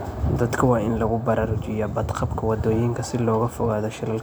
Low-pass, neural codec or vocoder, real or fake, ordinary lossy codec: none; none; real; none